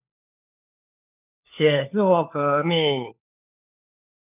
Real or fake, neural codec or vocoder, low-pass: fake; codec, 16 kHz, 4 kbps, FunCodec, trained on LibriTTS, 50 frames a second; 3.6 kHz